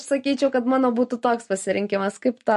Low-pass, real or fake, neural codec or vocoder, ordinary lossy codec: 14.4 kHz; real; none; MP3, 48 kbps